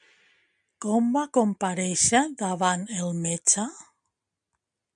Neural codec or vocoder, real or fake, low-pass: none; real; 9.9 kHz